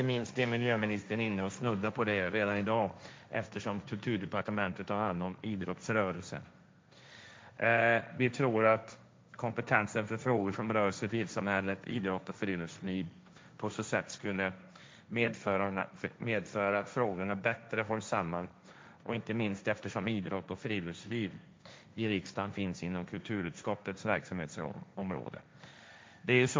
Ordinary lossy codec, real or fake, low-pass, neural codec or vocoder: none; fake; none; codec, 16 kHz, 1.1 kbps, Voila-Tokenizer